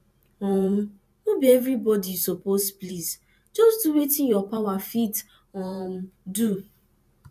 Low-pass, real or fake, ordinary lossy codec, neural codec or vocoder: 14.4 kHz; fake; AAC, 96 kbps; vocoder, 44.1 kHz, 128 mel bands every 512 samples, BigVGAN v2